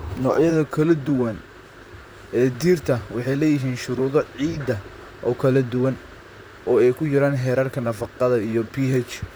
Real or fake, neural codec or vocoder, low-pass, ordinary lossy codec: fake; vocoder, 44.1 kHz, 128 mel bands, Pupu-Vocoder; none; none